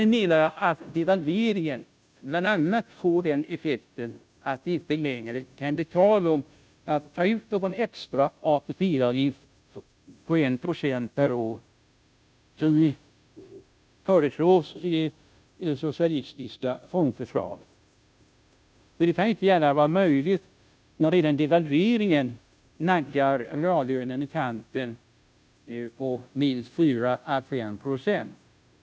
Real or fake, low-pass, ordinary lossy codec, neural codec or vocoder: fake; none; none; codec, 16 kHz, 0.5 kbps, FunCodec, trained on Chinese and English, 25 frames a second